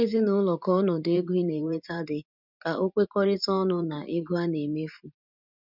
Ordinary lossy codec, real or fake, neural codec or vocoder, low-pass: none; fake; vocoder, 44.1 kHz, 128 mel bands every 512 samples, BigVGAN v2; 5.4 kHz